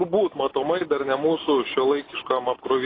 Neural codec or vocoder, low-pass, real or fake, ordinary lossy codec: none; 5.4 kHz; real; AAC, 24 kbps